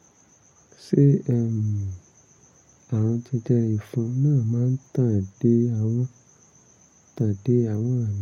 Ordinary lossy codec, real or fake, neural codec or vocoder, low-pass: MP3, 64 kbps; real; none; 19.8 kHz